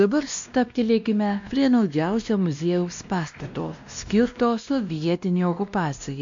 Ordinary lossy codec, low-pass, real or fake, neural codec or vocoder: MP3, 64 kbps; 7.2 kHz; fake; codec, 16 kHz, 1 kbps, X-Codec, WavLM features, trained on Multilingual LibriSpeech